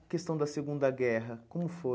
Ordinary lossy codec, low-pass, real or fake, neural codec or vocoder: none; none; real; none